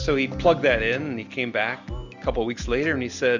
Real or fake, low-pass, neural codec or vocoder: real; 7.2 kHz; none